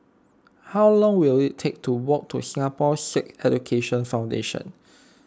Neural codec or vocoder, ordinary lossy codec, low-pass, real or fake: none; none; none; real